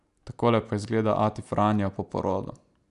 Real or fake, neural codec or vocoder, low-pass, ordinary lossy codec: real; none; 10.8 kHz; none